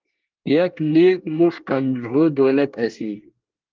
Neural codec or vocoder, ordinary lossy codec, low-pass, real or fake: codec, 24 kHz, 1 kbps, SNAC; Opus, 32 kbps; 7.2 kHz; fake